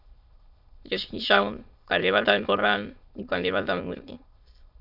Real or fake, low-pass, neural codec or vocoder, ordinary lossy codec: fake; 5.4 kHz; autoencoder, 22.05 kHz, a latent of 192 numbers a frame, VITS, trained on many speakers; Opus, 64 kbps